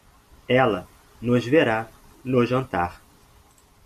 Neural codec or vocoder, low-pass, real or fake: none; 14.4 kHz; real